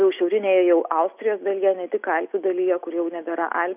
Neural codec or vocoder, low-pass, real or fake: none; 3.6 kHz; real